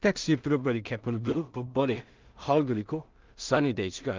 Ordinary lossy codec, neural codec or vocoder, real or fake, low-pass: Opus, 24 kbps; codec, 16 kHz in and 24 kHz out, 0.4 kbps, LongCat-Audio-Codec, two codebook decoder; fake; 7.2 kHz